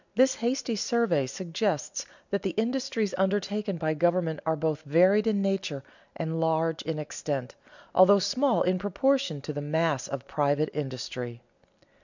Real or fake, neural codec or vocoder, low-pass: real; none; 7.2 kHz